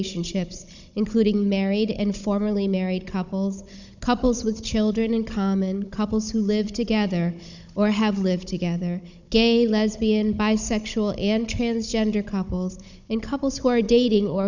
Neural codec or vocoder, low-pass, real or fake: codec, 16 kHz, 16 kbps, FunCodec, trained on Chinese and English, 50 frames a second; 7.2 kHz; fake